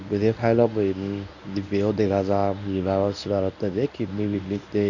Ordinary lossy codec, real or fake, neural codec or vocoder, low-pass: none; fake; codec, 24 kHz, 0.9 kbps, WavTokenizer, medium speech release version 1; 7.2 kHz